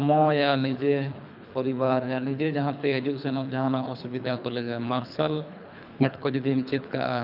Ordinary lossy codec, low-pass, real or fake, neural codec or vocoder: none; 5.4 kHz; fake; codec, 24 kHz, 3 kbps, HILCodec